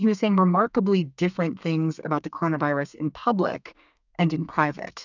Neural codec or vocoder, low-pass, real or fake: codec, 32 kHz, 1.9 kbps, SNAC; 7.2 kHz; fake